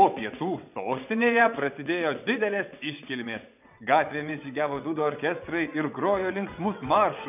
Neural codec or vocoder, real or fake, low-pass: vocoder, 22.05 kHz, 80 mel bands, WaveNeXt; fake; 3.6 kHz